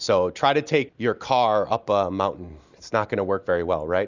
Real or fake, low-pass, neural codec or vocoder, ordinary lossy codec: real; 7.2 kHz; none; Opus, 64 kbps